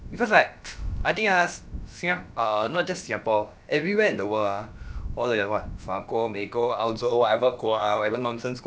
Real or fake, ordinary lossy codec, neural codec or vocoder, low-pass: fake; none; codec, 16 kHz, about 1 kbps, DyCAST, with the encoder's durations; none